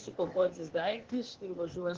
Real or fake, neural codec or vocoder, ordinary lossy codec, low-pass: fake; codec, 16 kHz, 0.8 kbps, ZipCodec; Opus, 16 kbps; 7.2 kHz